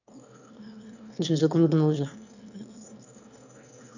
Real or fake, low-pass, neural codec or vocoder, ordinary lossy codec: fake; 7.2 kHz; autoencoder, 22.05 kHz, a latent of 192 numbers a frame, VITS, trained on one speaker; none